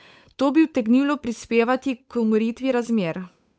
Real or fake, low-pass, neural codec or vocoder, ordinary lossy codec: fake; none; codec, 16 kHz, 8 kbps, FunCodec, trained on Chinese and English, 25 frames a second; none